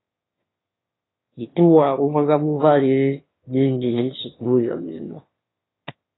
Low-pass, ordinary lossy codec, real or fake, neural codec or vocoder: 7.2 kHz; AAC, 16 kbps; fake; autoencoder, 22.05 kHz, a latent of 192 numbers a frame, VITS, trained on one speaker